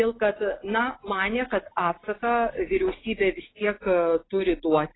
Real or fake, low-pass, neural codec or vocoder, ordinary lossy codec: real; 7.2 kHz; none; AAC, 16 kbps